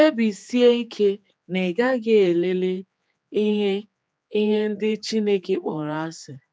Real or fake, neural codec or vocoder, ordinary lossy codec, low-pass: fake; codec, 16 kHz, 4 kbps, X-Codec, HuBERT features, trained on general audio; none; none